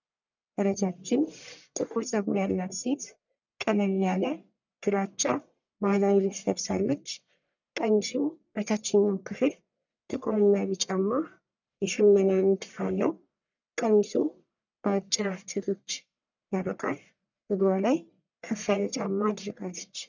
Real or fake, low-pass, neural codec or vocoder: fake; 7.2 kHz; codec, 44.1 kHz, 1.7 kbps, Pupu-Codec